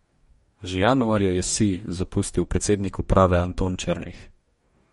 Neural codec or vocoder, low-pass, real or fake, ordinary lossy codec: codec, 44.1 kHz, 2.6 kbps, DAC; 19.8 kHz; fake; MP3, 48 kbps